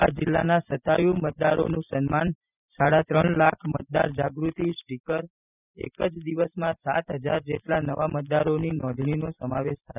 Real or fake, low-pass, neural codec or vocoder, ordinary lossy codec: real; 3.6 kHz; none; none